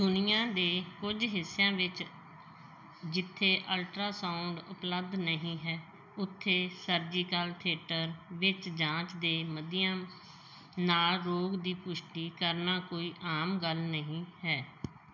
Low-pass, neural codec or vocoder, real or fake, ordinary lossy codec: 7.2 kHz; none; real; none